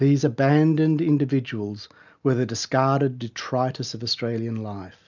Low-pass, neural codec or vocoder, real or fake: 7.2 kHz; none; real